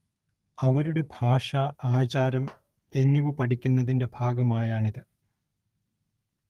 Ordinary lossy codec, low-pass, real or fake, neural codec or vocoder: Opus, 32 kbps; 14.4 kHz; fake; codec, 32 kHz, 1.9 kbps, SNAC